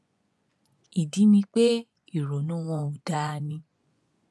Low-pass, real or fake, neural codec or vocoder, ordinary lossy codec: none; fake; vocoder, 24 kHz, 100 mel bands, Vocos; none